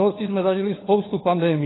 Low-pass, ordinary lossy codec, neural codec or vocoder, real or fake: 7.2 kHz; AAC, 16 kbps; codec, 16 kHz, 4 kbps, FunCodec, trained on LibriTTS, 50 frames a second; fake